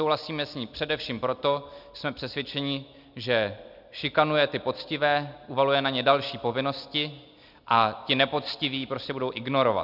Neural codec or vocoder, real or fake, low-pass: none; real; 5.4 kHz